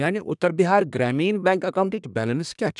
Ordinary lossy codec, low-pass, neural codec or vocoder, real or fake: none; 10.8 kHz; codec, 24 kHz, 1 kbps, SNAC; fake